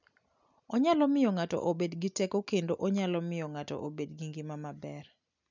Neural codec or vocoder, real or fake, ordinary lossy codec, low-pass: none; real; none; 7.2 kHz